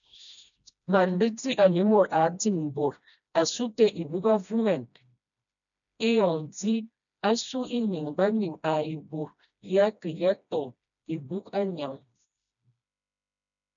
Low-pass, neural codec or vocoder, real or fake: 7.2 kHz; codec, 16 kHz, 1 kbps, FreqCodec, smaller model; fake